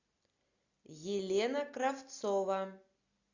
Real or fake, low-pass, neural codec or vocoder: real; 7.2 kHz; none